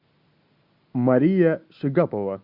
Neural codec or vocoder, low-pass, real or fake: none; 5.4 kHz; real